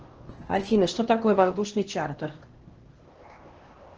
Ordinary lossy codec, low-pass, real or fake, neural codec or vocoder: Opus, 16 kbps; 7.2 kHz; fake; codec, 16 kHz, 1 kbps, X-Codec, HuBERT features, trained on LibriSpeech